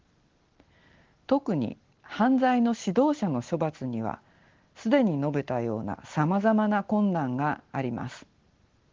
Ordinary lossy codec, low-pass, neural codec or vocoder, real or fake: Opus, 16 kbps; 7.2 kHz; none; real